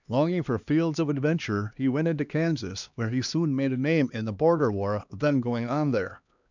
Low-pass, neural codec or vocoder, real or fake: 7.2 kHz; codec, 16 kHz, 2 kbps, X-Codec, HuBERT features, trained on LibriSpeech; fake